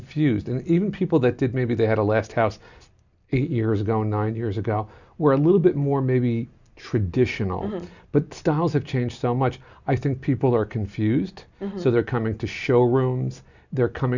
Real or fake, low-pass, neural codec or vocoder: real; 7.2 kHz; none